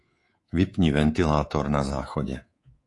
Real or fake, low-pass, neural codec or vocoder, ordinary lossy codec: fake; 10.8 kHz; codec, 24 kHz, 3.1 kbps, DualCodec; AAC, 48 kbps